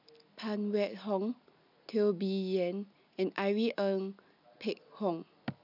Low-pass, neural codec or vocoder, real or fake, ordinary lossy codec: 5.4 kHz; none; real; none